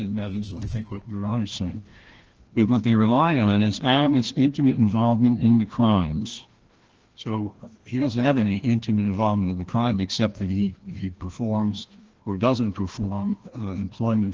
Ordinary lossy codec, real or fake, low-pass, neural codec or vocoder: Opus, 16 kbps; fake; 7.2 kHz; codec, 16 kHz, 1 kbps, FreqCodec, larger model